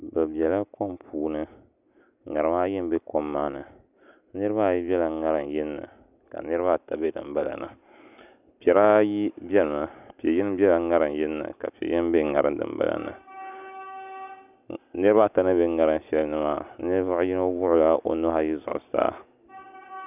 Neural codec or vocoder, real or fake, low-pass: none; real; 3.6 kHz